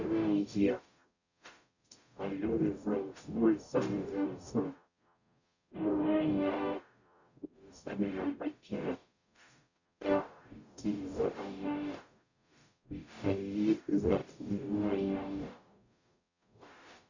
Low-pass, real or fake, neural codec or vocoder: 7.2 kHz; fake; codec, 44.1 kHz, 0.9 kbps, DAC